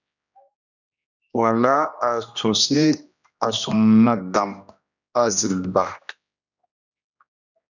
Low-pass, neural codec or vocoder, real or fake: 7.2 kHz; codec, 16 kHz, 1 kbps, X-Codec, HuBERT features, trained on general audio; fake